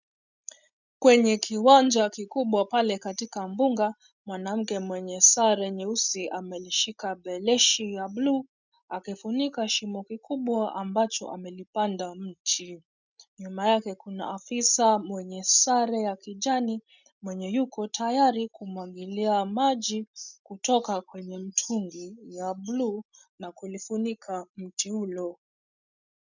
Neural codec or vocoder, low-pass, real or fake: none; 7.2 kHz; real